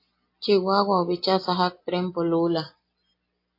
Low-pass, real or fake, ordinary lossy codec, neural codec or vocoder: 5.4 kHz; real; AAC, 32 kbps; none